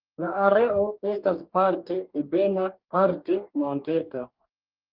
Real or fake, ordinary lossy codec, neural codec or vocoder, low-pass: fake; Opus, 32 kbps; codec, 44.1 kHz, 2.6 kbps, DAC; 5.4 kHz